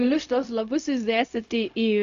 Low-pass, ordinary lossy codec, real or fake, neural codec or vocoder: 7.2 kHz; AAC, 96 kbps; fake; codec, 16 kHz, 0.4 kbps, LongCat-Audio-Codec